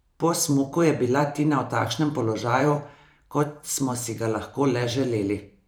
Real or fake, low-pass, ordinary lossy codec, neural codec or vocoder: fake; none; none; vocoder, 44.1 kHz, 128 mel bands every 512 samples, BigVGAN v2